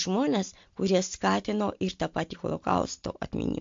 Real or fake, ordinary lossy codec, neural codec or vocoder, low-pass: real; MP3, 48 kbps; none; 7.2 kHz